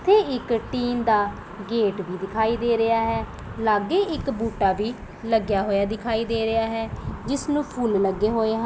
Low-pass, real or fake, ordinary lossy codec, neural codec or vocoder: none; real; none; none